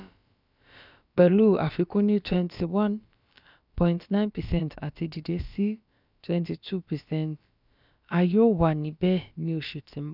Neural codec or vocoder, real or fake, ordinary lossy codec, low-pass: codec, 16 kHz, about 1 kbps, DyCAST, with the encoder's durations; fake; none; 5.4 kHz